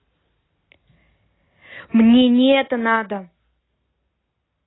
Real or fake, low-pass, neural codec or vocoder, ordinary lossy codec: real; 7.2 kHz; none; AAC, 16 kbps